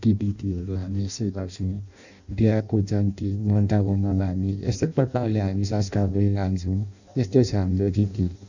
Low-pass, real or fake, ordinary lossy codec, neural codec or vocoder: 7.2 kHz; fake; none; codec, 16 kHz in and 24 kHz out, 0.6 kbps, FireRedTTS-2 codec